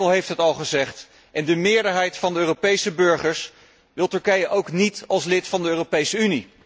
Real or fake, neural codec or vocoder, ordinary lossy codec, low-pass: real; none; none; none